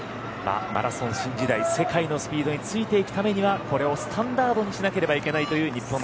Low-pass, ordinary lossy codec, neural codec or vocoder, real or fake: none; none; none; real